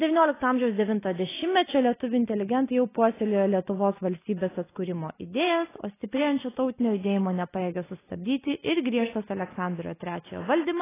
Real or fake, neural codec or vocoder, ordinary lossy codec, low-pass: real; none; AAC, 16 kbps; 3.6 kHz